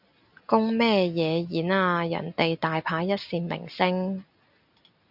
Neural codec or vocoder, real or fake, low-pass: none; real; 5.4 kHz